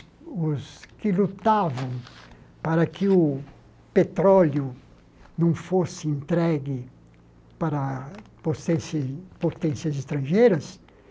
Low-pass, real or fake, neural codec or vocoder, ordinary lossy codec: none; real; none; none